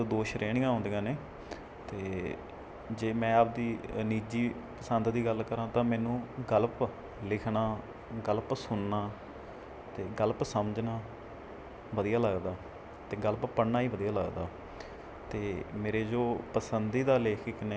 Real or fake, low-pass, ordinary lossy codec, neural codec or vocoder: real; none; none; none